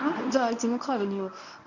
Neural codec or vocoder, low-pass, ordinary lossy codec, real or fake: codec, 24 kHz, 0.9 kbps, WavTokenizer, medium speech release version 1; 7.2 kHz; none; fake